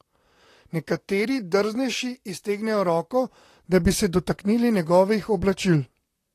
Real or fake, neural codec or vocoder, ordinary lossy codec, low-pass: fake; vocoder, 44.1 kHz, 128 mel bands, Pupu-Vocoder; AAC, 48 kbps; 14.4 kHz